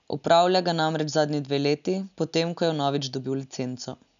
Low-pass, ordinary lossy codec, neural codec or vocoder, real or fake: 7.2 kHz; none; none; real